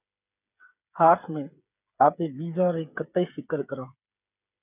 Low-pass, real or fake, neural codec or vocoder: 3.6 kHz; fake; codec, 16 kHz, 8 kbps, FreqCodec, smaller model